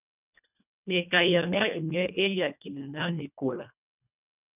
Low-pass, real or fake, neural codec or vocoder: 3.6 kHz; fake; codec, 24 kHz, 1.5 kbps, HILCodec